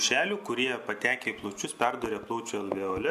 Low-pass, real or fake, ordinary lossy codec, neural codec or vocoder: 14.4 kHz; real; AAC, 96 kbps; none